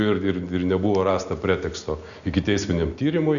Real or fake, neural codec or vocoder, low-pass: real; none; 7.2 kHz